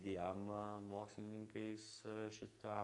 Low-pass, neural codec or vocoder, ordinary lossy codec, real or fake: 10.8 kHz; codec, 44.1 kHz, 2.6 kbps, SNAC; MP3, 64 kbps; fake